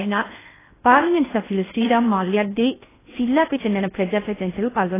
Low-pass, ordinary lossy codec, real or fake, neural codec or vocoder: 3.6 kHz; AAC, 16 kbps; fake; codec, 16 kHz in and 24 kHz out, 0.6 kbps, FocalCodec, streaming, 4096 codes